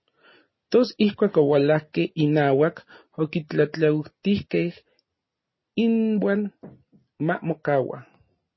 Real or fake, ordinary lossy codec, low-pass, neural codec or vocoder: real; MP3, 24 kbps; 7.2 kHz; none